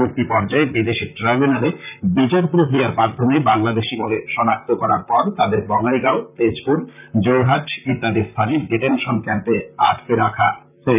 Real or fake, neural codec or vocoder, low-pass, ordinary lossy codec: fake; vocoder, 44.1 kHz, 128 mel bands, Pupu-Vocoder; 3.6 kHz; none